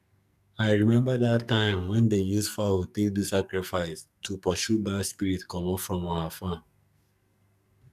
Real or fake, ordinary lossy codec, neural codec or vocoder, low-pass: fake; none; codec, 44.1 kHz, 2.6 kbps, SNAC; 14.4 kHz